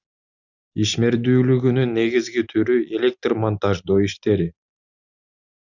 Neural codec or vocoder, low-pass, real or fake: none; 7.2 kHz; real